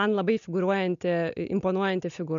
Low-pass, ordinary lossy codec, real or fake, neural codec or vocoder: 7.2 kHz; AAC, 96 kbps; real; none